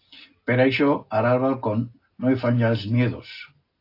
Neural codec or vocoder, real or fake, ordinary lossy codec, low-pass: none; real; AAC, 32 kbps; 5.4 kHz